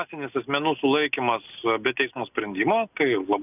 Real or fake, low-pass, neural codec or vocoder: real; 3.6 kHz; none